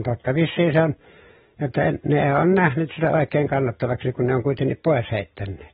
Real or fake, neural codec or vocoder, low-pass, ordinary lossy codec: real; none; 19.8 kHz; AAC, 16 kbps